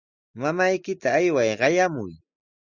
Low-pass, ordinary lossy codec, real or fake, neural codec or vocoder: 7.2 kHz; Opus, 64 kbps; real; none